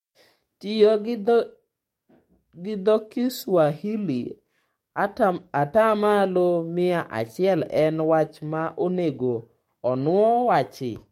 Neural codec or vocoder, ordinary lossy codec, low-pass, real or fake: codec, 44.1 kHz, 7.8 kbps, DAC; MP3, 64 kbps; 19.8 kHz; fake